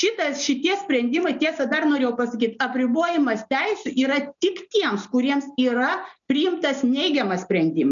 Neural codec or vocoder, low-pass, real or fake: none; 7.2 kHz; real